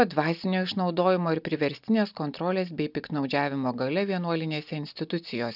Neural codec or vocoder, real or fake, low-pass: none; real; 5.4 kHz